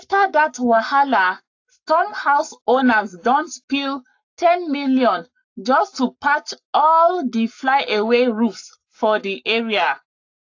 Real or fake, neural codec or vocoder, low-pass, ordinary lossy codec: fake; codec, 44.1 kHz, 7.8 kbps, Pupu-Codec; 7.2 kHz; AAC, 48 kbps